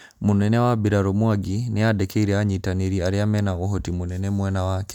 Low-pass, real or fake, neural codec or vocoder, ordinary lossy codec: 19.8 kHz; real; none; none